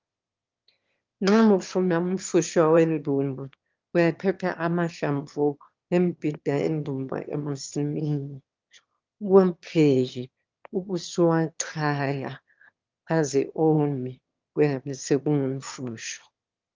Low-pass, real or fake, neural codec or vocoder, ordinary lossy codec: 7.2 kHz; fake; autoencoder, 22.05 kHz, a latent of 192 numbers a frame, VITS, trained on one speaker; Opus, 24 kbps